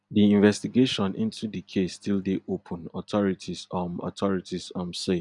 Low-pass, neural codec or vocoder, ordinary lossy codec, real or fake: 10.8 kHz; none; none; real